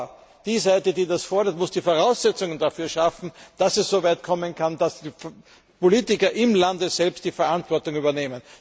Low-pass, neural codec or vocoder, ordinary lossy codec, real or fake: none; none; none; real